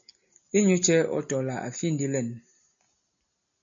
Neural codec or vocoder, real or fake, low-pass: none; real; 7.2 kHz